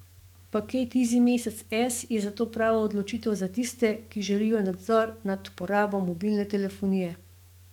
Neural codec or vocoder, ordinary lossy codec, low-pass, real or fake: codec, 44.1 kHz, 7.8 kbps, DAC; none; 19.8 kHz; fake